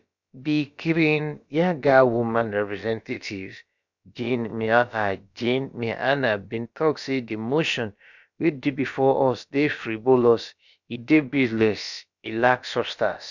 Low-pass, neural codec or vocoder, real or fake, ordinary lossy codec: 7.2 kHz; codec, 16 kHz, about 1 kbps, DyCAST, with the encoder's durations; fake; none